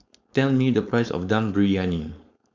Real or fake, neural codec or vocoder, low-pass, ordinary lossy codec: fake; codec, 16 kHz, 4.8 kbps, FACodec; 7.2 kHz; MP3, 64 kbps